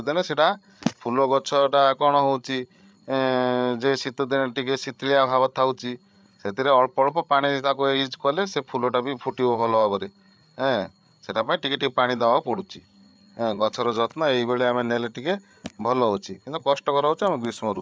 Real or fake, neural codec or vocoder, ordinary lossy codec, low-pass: fake; codec, 16 kHz, 8 kbps, FreqCodec, larger model; none; none